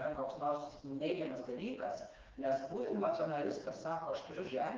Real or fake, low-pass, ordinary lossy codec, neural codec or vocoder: fake; 7.2 kHz; Opus, 32 kbps; codec, 16 kHz, 2 kbps, FreqCodec, smaller model